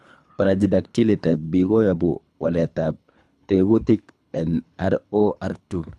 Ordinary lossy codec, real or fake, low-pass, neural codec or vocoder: none; fake; none; codec, 24 kHz, 3 kbps, HILCodec